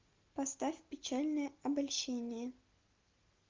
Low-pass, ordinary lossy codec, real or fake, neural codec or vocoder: 7.2 kHz; Opus, 32 kbps; real; none